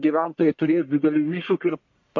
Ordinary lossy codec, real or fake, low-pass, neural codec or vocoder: MP3, 48 kbps; fake; 7.2 kHz; codec, 44.1 kHz, 1.7 kbps, Pupu-Codec